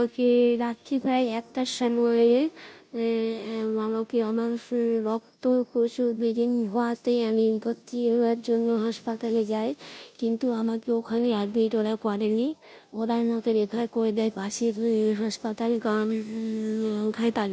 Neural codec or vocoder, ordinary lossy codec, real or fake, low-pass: codec, 16 kHz, 0.5 kbps, FunCodec, trained on Chinese and English, 25 frames a second; none; fake; none